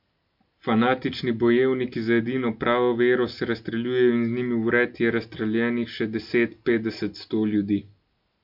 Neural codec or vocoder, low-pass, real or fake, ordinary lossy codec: none; 5.4 kHz; real; MP3, 48 kbps